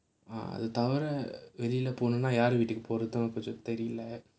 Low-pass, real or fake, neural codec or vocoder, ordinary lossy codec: none; real; none; none